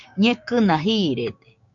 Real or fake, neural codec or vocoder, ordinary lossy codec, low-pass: fake; codec, 16 kHz, 6 kbps, DAC; MP3, 96 kbps; 7.2 kHz